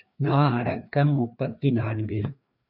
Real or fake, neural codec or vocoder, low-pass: fake; codec, 16 kHz, 2 kbps, FreqCodec, larger model; 5.4 kHz